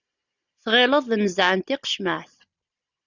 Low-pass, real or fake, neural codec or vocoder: 7.2 kHz; real; none